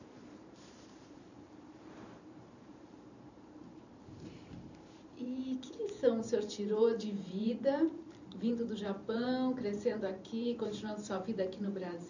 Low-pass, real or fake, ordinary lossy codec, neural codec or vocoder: 7.2 kHz; fake; none; vocoder, 44.1 kHz, 128 mel bands every 512 samples, BigVGAN v2